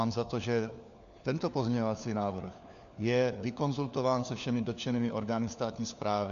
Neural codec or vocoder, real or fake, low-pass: codec, 16 kHz, 4 kbps, FunCodec, trained on LibriTTS, 50 frames a second; fake; 7.2 kHz